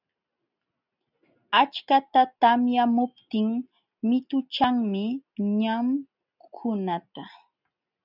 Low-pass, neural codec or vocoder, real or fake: 5.4 kHz; none; real